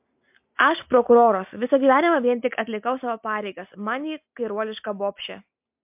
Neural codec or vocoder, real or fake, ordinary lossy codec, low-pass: none; real; MP3, 32 kbps; 3.6 kHz